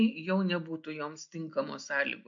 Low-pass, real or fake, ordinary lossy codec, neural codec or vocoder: 7.2 kHz; real; MP3, 48 kbps; none